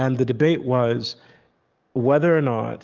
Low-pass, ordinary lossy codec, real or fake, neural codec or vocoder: 7.2 kHz; Opus, 24 kbps; fake; codec, 44.1 kHz, 7.8 kbps, DAC